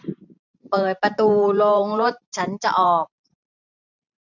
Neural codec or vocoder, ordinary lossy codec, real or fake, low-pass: vocoder, 44.1 kHz, 128 mel bands every 512 samples, BigVGAN v2; none; fake; 7.2 kHz